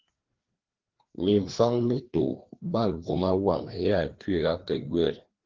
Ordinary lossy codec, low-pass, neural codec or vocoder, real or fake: Opus, 16 kbps; 7.2 kHz; codec, 16 kHz, 2 kbps, FreqCodec, larger model; fake